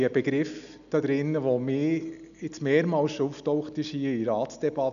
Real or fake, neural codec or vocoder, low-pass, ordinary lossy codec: real; none; 7.2 kHz; none